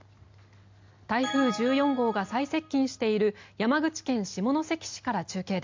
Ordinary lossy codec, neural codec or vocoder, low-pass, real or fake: MP3, 64 kbps; none; 7.2 kHz; real